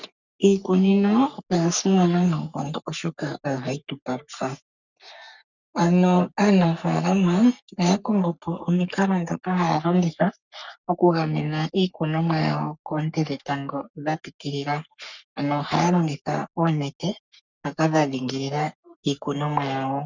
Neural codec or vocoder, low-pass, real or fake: codec, 44.1 kHz, 3.4 kbps, Pupu-Codec; 7.2 kHz; fake